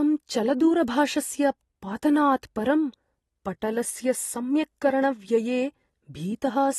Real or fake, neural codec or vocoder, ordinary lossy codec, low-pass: real; none; AAC, 32 kbps; 19.8 kHz